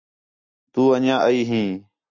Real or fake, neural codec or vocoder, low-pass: real; none; 7.2 kHz